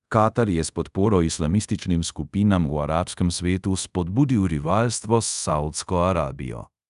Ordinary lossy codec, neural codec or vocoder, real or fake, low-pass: none; codec, 24 kHz, 0.5 kbps, DualCodec; fake; 10.8 kHz